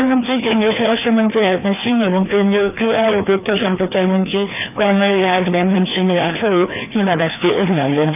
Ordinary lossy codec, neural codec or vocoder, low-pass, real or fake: none; codec, 16 kHz, 2 kbps, FreqCodec, larger model; 3.6 kHz; fake